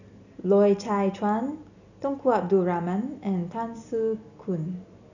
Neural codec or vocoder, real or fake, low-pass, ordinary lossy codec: none; real; 7.2 kHz; none